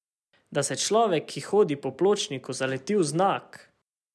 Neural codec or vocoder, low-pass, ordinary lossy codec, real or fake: none; none; none; real